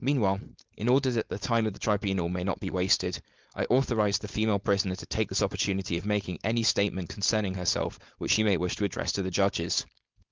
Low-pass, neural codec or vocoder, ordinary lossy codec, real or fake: 7.2 kHz; codec, 16 kHz, 4.8 kbps, FACodec; Opus, 32 kbps; fake